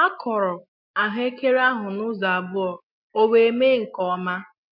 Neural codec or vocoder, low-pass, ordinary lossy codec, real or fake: none; 5.4 kHz; MP3, 48 kbps; real